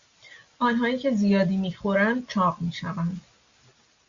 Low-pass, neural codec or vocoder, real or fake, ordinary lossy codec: 7.2 kHz; none; real; Opus, 64 kbps